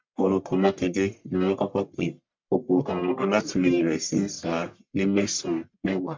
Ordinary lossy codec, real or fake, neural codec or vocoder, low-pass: MP3, 64 kbps; fake; codec, 44.1 kHz, 1.7 kbps, Pupu-Codec; 7.2 kHz